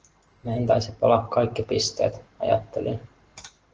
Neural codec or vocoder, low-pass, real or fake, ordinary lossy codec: none; 7.2 kHz; real; Opus, 16 kbps